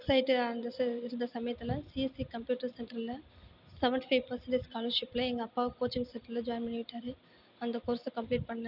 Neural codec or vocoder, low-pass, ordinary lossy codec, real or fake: none; 5.4 kHz; none; real